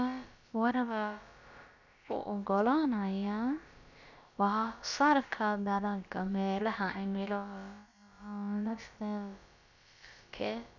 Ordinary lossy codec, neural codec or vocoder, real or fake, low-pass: none; codec, 16 kHz, about 1 kbps, DyCAST, with the encoder's durations; fake; 7.2 kHz